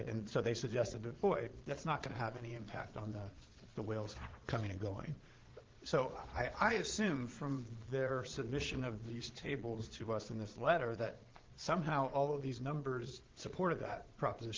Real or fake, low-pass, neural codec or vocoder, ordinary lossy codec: fake; 7.2 kHz; vocoder, 22.05 kHz, 80 mel bands, Vocos; Opus, 16 kbps